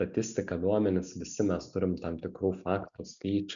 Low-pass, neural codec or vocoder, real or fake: 7.2 kHz; none; real